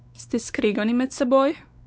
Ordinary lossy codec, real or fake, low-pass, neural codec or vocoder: none; fake; none; codec, 16 kHz, 4 kbps, X-Codec, WavLM features, trained on Multilingual LibriSpeech